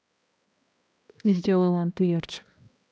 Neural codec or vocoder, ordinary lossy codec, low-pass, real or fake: codec, 16 kHz, 1 kbps, X-Codec, HuBERT features, trained on balanced general audio; none; none; fake